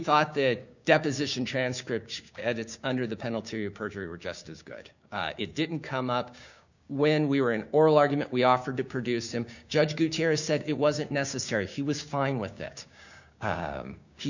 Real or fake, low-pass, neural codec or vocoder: fake; 7.2 kHz; codec, 16 kHz, 6 kbps, DAC